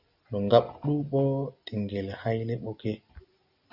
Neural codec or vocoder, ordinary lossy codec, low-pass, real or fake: none; Opus, 64 kbps; 5.4 kHz; real